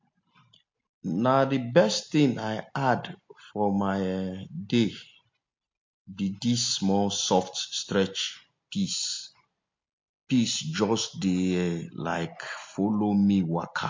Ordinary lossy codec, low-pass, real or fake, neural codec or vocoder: MP3, 48 kbps; 7.2 kHz; real; none